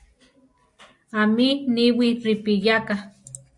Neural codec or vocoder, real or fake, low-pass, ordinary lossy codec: none; real; 10.8 kHz; AAC, 64 kbps